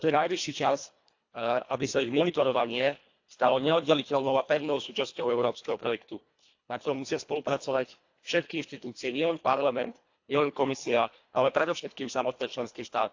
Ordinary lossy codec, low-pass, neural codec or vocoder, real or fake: AAC, 48 kbps; 7.2 kHz; codec, 24 kHz, 1.5 kbps, HILCodec; fake